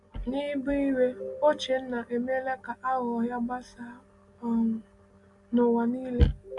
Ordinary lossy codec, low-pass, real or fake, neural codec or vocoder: MP3, 64 kbps; 10.8 kHz; real; none